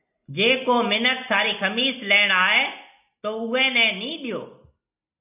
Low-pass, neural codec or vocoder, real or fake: 3.6 kHz; none; real